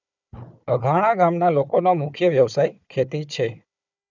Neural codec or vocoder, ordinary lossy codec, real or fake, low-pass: codec, 16 kHz, 4 kbps, FunCodec, trained on Chinese and English, 50 frames a second; none; fake; 7.2 kHz